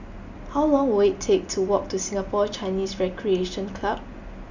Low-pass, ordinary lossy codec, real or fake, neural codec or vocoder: 7.2 kHz; none; real; none